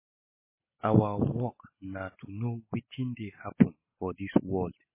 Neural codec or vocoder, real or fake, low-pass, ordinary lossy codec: none; real; 3.6 kHz; MP3, 16 kbps